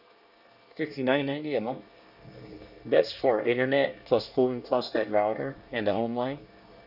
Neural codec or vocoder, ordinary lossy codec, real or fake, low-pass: codec, 24 kHz, 1 kbps, SNAC; none; fake; 5.4 kHz